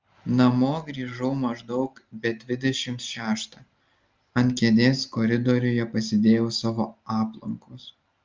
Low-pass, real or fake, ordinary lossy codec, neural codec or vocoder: 7.2 kHz; real; Opus, 32 kbps; none